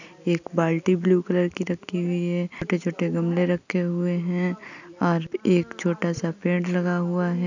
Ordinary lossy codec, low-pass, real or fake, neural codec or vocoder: none; 7.2 kHz; real; none